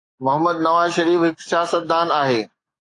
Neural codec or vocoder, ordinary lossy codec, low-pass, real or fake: codec, 44.1 kHz, 7.8 kbps, DAC; AAC, 48 kbps; 10.8 kHz; fake